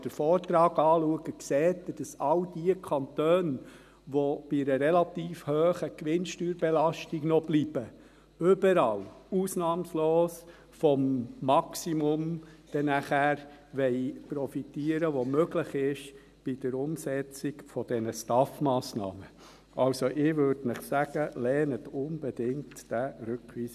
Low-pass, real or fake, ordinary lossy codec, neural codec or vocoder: 14.4 kHz; real; none; none